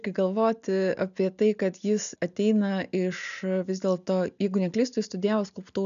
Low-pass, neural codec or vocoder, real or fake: 7.2 kHz; none; real